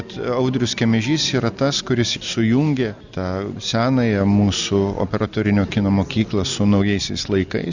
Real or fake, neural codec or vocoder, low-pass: real; none; 7.2 kHz